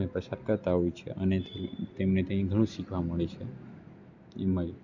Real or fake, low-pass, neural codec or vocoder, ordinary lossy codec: real; 7.2 kHz; none; none